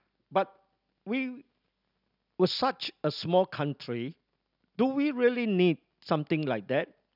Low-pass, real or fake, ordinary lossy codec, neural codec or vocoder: 5.4 kHz; real; none; none